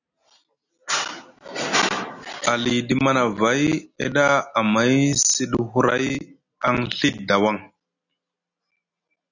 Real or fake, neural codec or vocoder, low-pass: real; none; 7.2 kHz